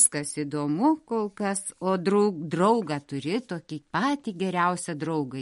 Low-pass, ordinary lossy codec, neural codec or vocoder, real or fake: 19.8 kHz; MP3, 48 kbps; none; real